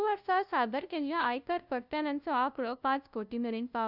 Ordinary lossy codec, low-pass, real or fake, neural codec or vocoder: none; 5.4 kHz; fake; codec, 16 kHz, 0.5 kbps, FunCodec, trained on LibriTTS, 25 frames a second